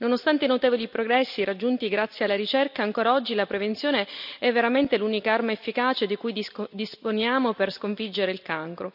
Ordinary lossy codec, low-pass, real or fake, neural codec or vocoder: none; 5.4 kHz; real; none